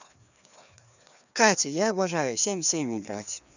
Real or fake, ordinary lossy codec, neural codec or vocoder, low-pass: fake; none; codec, 16 kHz, 2 kbps, FreqCodec, larger model; 7.2 kHz